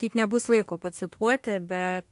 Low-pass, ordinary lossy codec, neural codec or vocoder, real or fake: 10.8 kHz; AAC, 64 kbps; codec, 24 kHz, 1 kbps, SNAC; fake